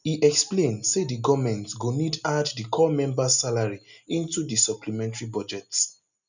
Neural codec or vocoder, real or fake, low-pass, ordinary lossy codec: none; real; 7.2 kHz; none